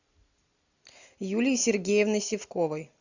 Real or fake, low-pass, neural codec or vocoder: real; 7.2 kHz; none